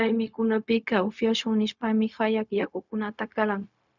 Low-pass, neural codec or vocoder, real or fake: 7.2 kHz; codec, 16 kHz, 0.4 kbps, LongCat-Audio-Codec; fake